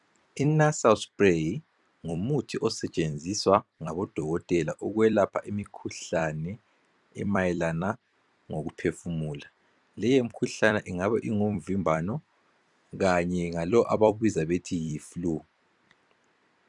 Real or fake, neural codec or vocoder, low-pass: fake; vocoder, 44.1 kHz, 128 mel bands every 256 samples, BigVGAN v2; 10.8 kHz